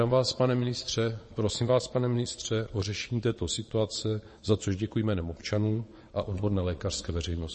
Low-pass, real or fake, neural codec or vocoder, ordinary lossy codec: 9.9 kHz; fake; vocoder, 22.05 kHz, 80 mel bands, Vocos; MP3, 32 kbps